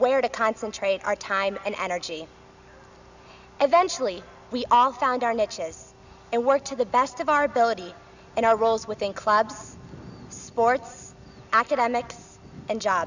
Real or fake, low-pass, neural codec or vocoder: real; 7.2 kHz; none